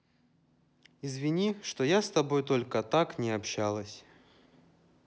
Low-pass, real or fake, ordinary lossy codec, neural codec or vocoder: none; real; none; none